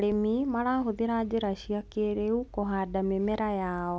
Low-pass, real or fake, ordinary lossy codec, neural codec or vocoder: none; real; none; none